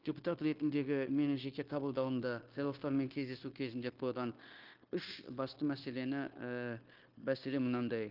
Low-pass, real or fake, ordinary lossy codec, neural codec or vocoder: 5.4 kHz; fake; Opus, 32 kbps; codec, 16 kHz, 0.9 kbps, LongCat-Audio-Codec